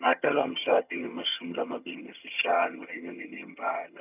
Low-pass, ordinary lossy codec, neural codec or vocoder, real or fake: 3.6 kHz; none; vocoder, 22.05 kHz, 80 mel bands, HiFi-GAN; fake